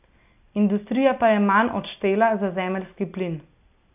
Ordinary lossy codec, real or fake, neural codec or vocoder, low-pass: AAC, 32 kbps; real; none; 3.6 kHz